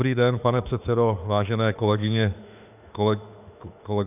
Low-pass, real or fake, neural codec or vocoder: 3.6 kHz; fake; autoencoder, 48 kHz, 32 numbers a frame, DAC-VAE, trained on Japanese speech